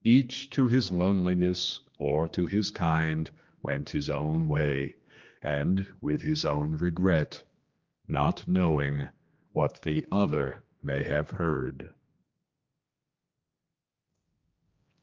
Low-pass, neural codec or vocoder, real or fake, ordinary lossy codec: 7.2 kHz; codec, 16 kHz, 2 kbps, X-Codec, HuBERT features, trained on general audio; fake; Opus, 24 kbps